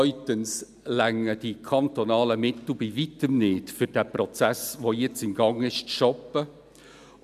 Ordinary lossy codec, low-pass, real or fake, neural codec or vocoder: none; 14.4 kHz; real; none